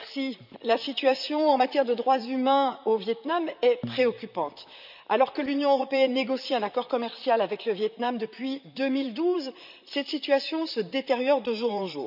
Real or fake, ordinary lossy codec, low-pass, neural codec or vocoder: fake; none; 5.4 kHz; vocoder, 44.1 kHz, 128 mel bands, Pupu-Vocoder